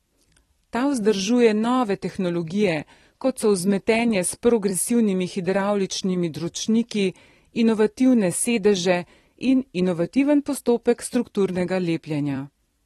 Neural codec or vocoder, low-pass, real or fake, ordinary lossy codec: vocoder, 44.1 kHz, 128 mel bands every 256 samples, BigVGAN v2; 19.8 kHz; fake; AAC, 32 kbps